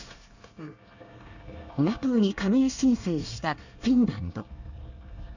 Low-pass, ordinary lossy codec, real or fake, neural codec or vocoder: 7.2 kHz; none; fake; codec, 24 kHz, 1 kbps, SNAC